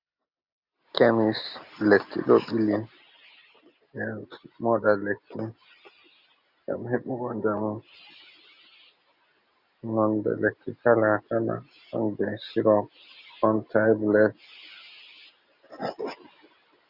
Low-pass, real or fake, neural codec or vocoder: 5.4 kHz; real; none